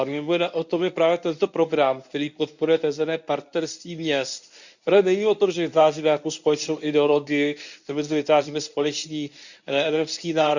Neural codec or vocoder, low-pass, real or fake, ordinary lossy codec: codec, 24 kHz, 0.9 kbps, WavTokenizer, medium speech release version 1; 7.2 kHz; fake; none